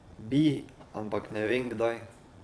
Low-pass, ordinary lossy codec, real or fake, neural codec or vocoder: none; none; fake; vocoder, 22.05 kHz, 80 mel bands, Vocos